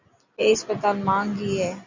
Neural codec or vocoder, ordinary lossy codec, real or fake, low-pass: none; Opus, 64 kbps; real; 7.2 kHz